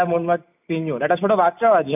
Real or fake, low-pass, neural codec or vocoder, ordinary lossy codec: real; 3.6 kHz; none; none